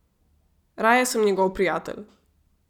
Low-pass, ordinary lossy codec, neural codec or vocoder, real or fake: 19.8 kHz; none; none; real